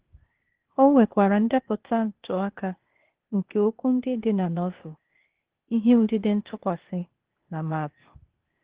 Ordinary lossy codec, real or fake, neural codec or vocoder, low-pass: Opus, 16 kbps; fake; codec, 16 kHz, 0.8 kbps, ZipCodec; 3.6 kHz